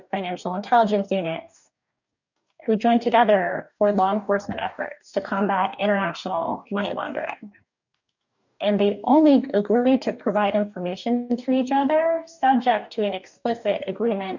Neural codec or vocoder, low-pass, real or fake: codec, 44.1 kHz, 2.6 kbps, DAC; 7.2 kHz; fake